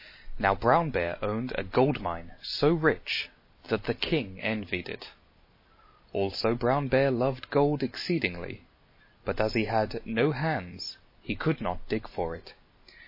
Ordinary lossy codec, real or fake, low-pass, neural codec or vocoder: MP3, 24 kbps; real; 5.4 kHz; none